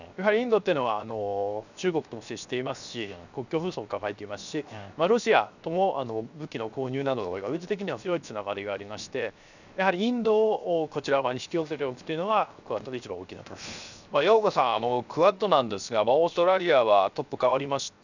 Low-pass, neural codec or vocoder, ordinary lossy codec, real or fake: 7.2 kHz; codec, 16 kHz, 0.7 kbps, FocalCodec; none; fake